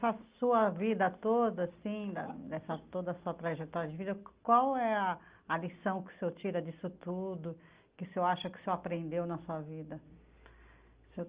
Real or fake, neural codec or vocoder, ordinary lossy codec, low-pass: real; none; Opus, 32 kbps; 3.6 kHz